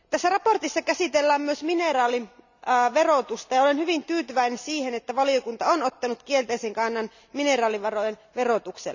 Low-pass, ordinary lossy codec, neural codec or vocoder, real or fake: 7.2 kHz; none; none; real